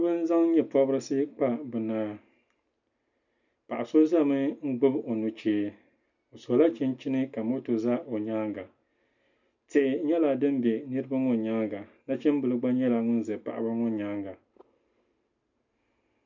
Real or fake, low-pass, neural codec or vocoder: real; 7.2 kHz; none